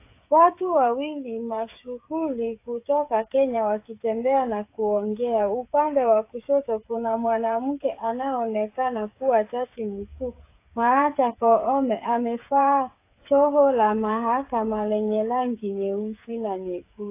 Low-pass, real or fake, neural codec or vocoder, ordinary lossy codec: 3.6 kHz; fake; codec, 16 kHz, 8 kbps, FreqCodec, smaller model; AAC, 24 kbps